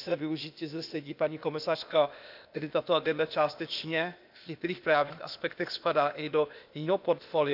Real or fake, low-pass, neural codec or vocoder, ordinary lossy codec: fake; 5.4 kHz; codec, 16 kHz, 0.8 kbps, ZipCodec; none